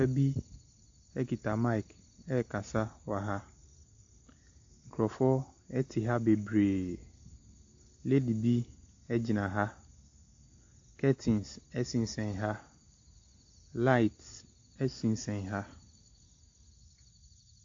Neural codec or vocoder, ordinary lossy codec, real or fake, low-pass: none; AAC, 48 kbps; real; 7.2 kHz